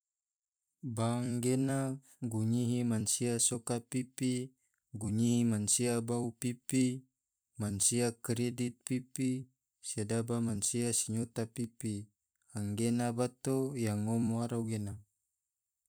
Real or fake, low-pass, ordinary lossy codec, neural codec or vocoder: fake; none; none; vocoder, 44.1 kHz, 128 mel bands every 256 samples, BigVGAN v2